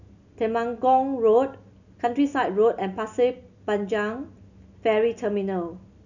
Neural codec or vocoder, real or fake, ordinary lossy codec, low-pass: none; real; none; 7.2 kHz